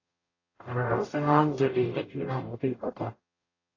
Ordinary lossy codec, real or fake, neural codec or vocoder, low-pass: AAC, 32 kbps; fake; codec, 44.1 kHz, 0.9 kbps, DAC; 7.2 kHz